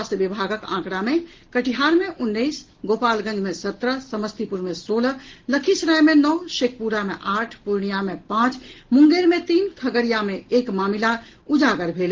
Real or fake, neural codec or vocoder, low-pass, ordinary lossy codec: real; none; 7.2 kHz; Opus, 16 kbps